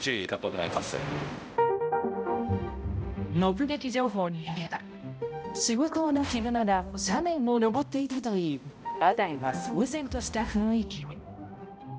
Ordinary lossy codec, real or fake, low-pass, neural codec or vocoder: none; fake; none; codec, 16 kHz, 0.5 kbps, X-Codec, HuBERT features, trained on balanced general audio